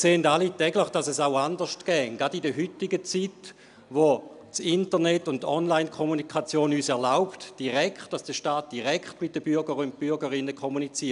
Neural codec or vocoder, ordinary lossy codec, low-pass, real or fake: none; none; 10.8 kHz; real